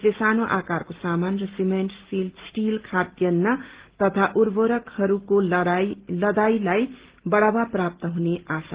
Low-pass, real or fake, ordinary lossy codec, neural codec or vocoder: 3.6 kHz; real; Opus, 16 kbps; none